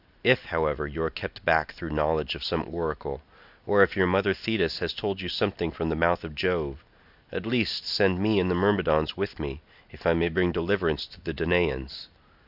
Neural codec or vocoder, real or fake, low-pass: none; real; 5.4 kHz